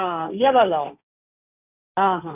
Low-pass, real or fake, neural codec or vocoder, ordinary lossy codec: 3.6 kHz; fake; codec, 16 kHz, 1.1 kbps, Voila-Tokenizer; none